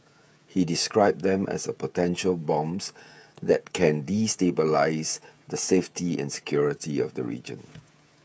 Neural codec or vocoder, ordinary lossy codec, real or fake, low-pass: codec, 16 kHz, 16 kbps, FreqCodec, smaller model; none; fake; none